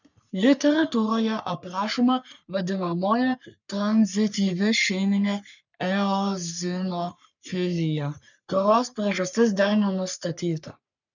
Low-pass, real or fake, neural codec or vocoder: 7.2 kHz; fake; codec, 44.1 kHz, 3.4 kbps, Pupu-Codec